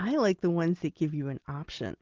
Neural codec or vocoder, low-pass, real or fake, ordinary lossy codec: none; 7.2 kHz; real; Opus, 16 kbps